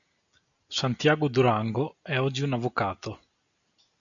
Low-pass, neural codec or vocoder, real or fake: 7.2 kHz; none; real